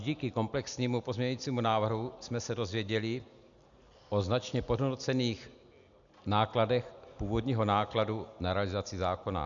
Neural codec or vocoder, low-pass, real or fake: none; 7.2 kHz; real